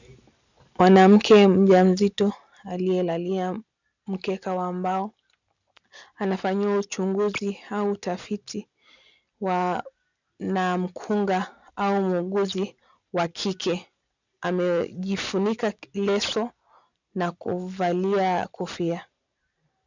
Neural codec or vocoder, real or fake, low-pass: none; real; 7.2 kHz